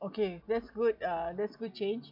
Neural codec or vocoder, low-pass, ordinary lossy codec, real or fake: none; 5.4 kHz; none; real